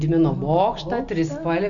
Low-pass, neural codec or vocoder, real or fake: 7.2 kHz; none; real